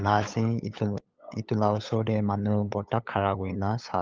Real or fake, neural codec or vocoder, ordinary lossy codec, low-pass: fake; codec, 16 kHz, 8 kbps, FunCodec, trained on LibriTTS, 25 frames a second; Opus, 32 kbps; 7.2 kHz